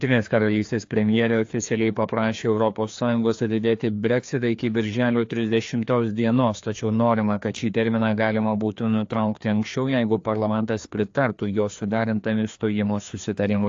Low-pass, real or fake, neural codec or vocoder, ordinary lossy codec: 7.2 kHz; fake; codec, 16 kHz, 2 kbps, FreqCodec, larger model; AAC, 48 kbps